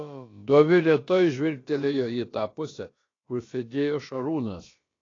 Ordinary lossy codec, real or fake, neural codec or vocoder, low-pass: AAC, 32 kbps; fake; codec, 16 kHz, about 1 kbps, DyCAST, with the encoder's durations; 7.2 kHz